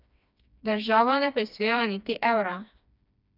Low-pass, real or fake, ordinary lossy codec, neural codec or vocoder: 5.4 kHz; fake; none; codec, 16 kHz, 2 kbps, FreqCodec, smaller model